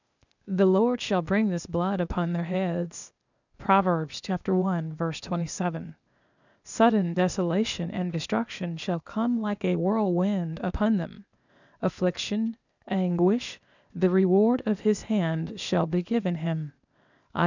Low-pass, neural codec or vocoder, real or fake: 7.2 kHz; codec, 16 kHz, 0.8 kbps, ZipCodec; fake